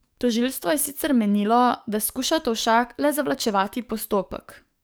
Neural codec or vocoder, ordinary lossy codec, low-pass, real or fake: codec, 44.1 kHz, 7.8 kbps, DAC; none; none; fake